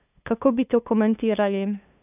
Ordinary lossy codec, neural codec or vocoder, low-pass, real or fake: none; codec, 16 kHz, 2 kbps, FunCodec, trained on LibriTTS, 25 frames a second; 3.6 kHz; fake